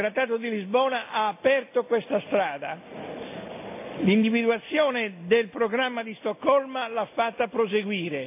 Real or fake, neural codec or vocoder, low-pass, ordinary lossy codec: real; none; 3.6 kHz; AAC, 32 kbps